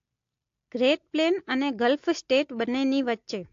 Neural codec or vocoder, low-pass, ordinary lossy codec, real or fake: none; 7.2 kHz; AAC, 48 kbps; real